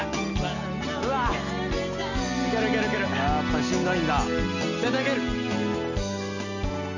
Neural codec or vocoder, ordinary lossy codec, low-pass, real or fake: none; none; 7.2 kHz; real